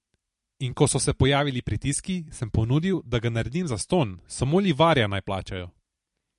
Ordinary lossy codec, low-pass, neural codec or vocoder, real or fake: MP3, 48 kbps; 14.4 kHz; vocoder, 44.1 kHz, 128 mel bands every 256 samples, BigVGAN v2; fake